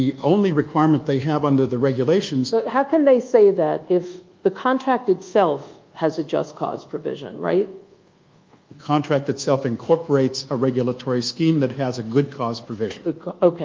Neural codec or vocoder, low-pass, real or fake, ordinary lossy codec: codec, 24 kHz, 1.2 kbps, DualCodec; 7.2 kHz; fake; Opus, 24 kbps